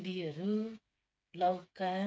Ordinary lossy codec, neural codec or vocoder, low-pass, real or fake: none; codec, 16 kHz, 4 kbps, FreqCodec, smaller model; none; fake